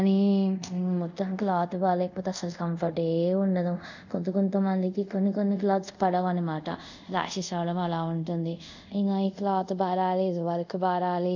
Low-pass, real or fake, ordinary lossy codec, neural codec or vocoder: 7.2 kHz; fake; none; codec, 24 kHz, 0.5 kbps, DualCodec